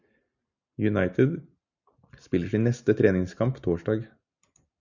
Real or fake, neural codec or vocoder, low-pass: real; none; 7.2 kHz